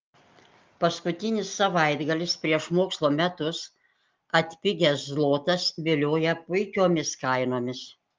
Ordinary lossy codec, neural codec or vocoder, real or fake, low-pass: Opus, 32 kbps; none; real; 7.2 kHz